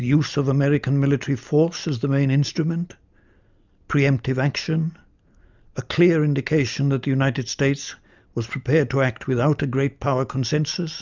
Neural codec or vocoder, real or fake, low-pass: none; real; 7.2 kHz